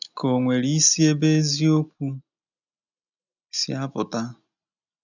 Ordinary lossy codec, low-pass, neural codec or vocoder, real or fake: none; 7.2 kHz; none; real